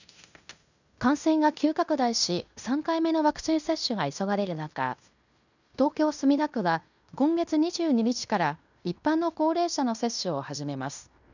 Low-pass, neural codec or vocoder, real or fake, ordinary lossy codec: 7.2 kHz; codec, 16 kHz in and 24 kHz out, 0.9 kbps, LongCat-Audio-Codec, fine tuned four codebook decoder; fake; none